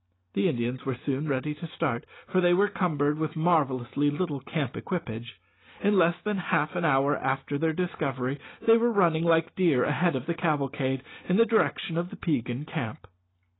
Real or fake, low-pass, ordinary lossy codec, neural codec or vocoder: real; 7.2 kHz; AAC, 16 kbps; none